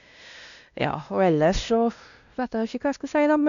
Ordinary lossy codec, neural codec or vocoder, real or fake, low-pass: none; codec, 16 kHz, 1 kbps, X-Codec, WavLM features, trained on Multilingual LibriSpeech; fake; 7.2 kHz